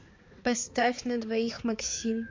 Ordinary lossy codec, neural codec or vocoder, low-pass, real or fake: MP3, 48 kbps; codec, 16 kHz, 4 kbps, X-Codec, HuBERT features, trained on balanced general audio; 7.2 kHz; fake